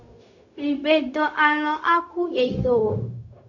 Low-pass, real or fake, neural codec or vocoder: 7.2 kHz; fake; codec, 16 kHz, 0.4 kbps, LongCat-Audio-Codec